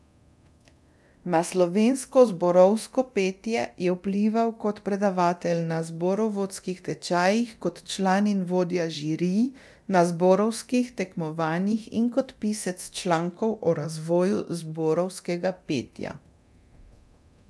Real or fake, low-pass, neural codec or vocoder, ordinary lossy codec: fake; none; codec, 24 kHz, 0.9 kbps, DualCodec; none